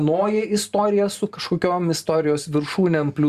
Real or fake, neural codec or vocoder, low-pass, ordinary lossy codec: fake; vocoder, 44.1 kHz, 128 mel bands every 512 samples, BigVGAN v2; 14.4 kHz; Opus, 64 kbps